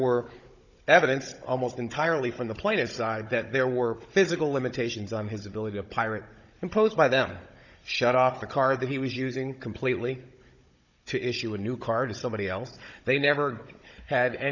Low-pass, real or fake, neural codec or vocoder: 7.2 kHz; fake; codec, 16 kHz, 16 kbps, FunCodec, trained on Chinese and English, 50 frames a second